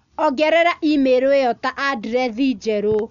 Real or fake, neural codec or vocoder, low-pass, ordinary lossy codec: real; none; 7.2 kHz; none